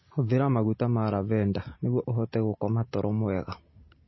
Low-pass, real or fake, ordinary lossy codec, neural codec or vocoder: 7.2 kHz; real; MP3, 24 kbps; none